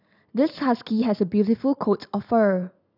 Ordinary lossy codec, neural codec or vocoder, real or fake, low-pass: none; none; real; 5.4 kHz